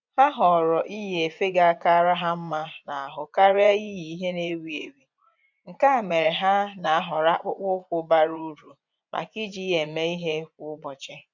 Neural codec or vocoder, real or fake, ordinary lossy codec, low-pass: vocoder, 24 kHz, 100 mel bands, Vocos; fake; none; 7.2 kHz